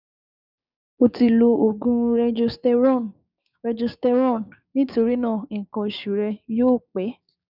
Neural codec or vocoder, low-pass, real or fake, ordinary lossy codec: codec, 16 kHz, 6 kbps, DAC; 5.4 kHz; fake; none